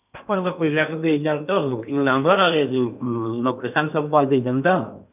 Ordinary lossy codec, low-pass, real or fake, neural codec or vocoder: none; 3.6 kHz; fake; codec, 16 kHz in and 24 kHz out, 0.8 kbps, FocalCodec, streaming, 65536 codes